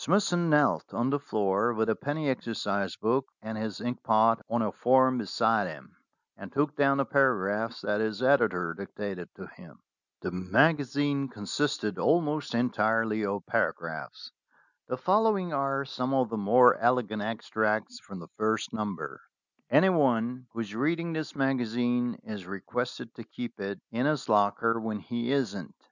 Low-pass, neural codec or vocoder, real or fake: 7.2 kHz; none; real